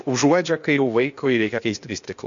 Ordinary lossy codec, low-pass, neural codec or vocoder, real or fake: MP3, 48 kbps; 7.2 kHz; codec, 16 kHz, 0.8 kbps, ZipCodec; fake